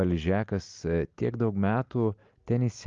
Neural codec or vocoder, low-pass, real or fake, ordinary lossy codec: none; 7.2 kHz; real; Opus, 32 kbps